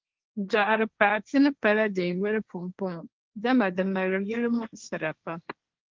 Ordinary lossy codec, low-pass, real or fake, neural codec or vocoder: Opus, 24 kbps; 7.2 kHz; fake; codec, 16 kHz, 1.1 kbps, Voila-Tokenizer